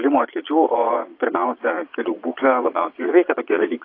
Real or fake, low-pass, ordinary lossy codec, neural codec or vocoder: fake; 5.4 kHz; AAC, 32 kbps; vocoder, 22.05 kHz, 80 mel bands, Vocos